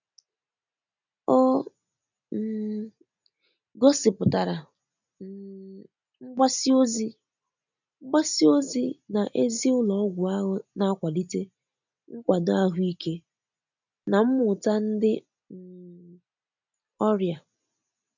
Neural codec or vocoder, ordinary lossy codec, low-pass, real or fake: none; none; 7.2 kHz; real